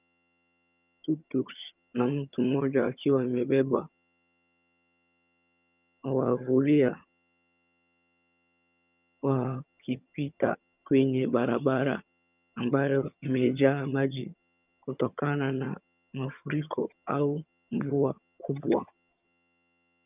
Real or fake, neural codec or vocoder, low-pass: fake; vocoder, 22.05 kHz, 80 mel bands, HiFi-GAN; 3.6 kHz